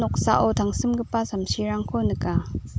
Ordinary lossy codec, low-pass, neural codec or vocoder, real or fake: none; none; none; real